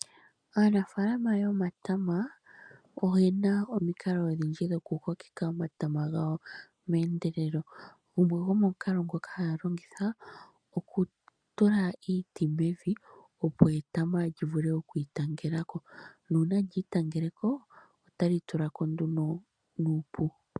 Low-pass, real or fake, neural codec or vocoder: 9.9 kHz; real; none